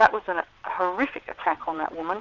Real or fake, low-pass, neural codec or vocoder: fake; 7.2 kHz; vocoder, 22.05 kHz, 80 mel bands, WaveNeXt